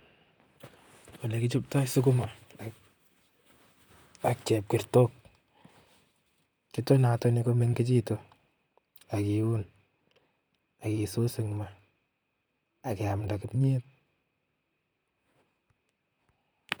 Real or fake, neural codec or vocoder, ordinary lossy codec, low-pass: fake; vocoder, 44.1 kHz, 128 mel bands, Pupu-Vocoder; none; none